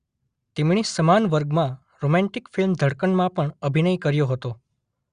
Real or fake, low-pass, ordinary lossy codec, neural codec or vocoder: real; 9.9 kHz; Opus, 64 kbps; none